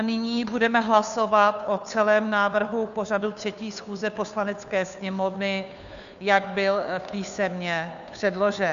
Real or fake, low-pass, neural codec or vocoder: fake; 7.2 kHz; codec, 16 kHz, 2 kbps, FunCodec, trained on Chinese and English, 25 frames a second